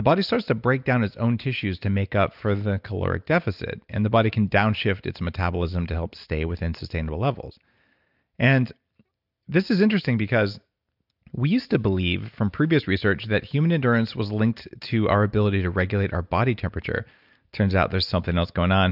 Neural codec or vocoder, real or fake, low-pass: none; real; 5.4 kHz